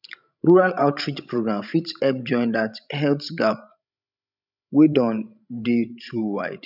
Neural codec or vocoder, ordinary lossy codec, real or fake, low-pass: codec, 16 kHz, 16 kbps, FreqCodec, larger model; none; fake; 5.4 kHz